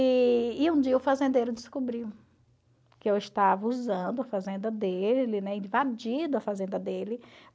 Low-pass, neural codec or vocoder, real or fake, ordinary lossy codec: none; none; real; none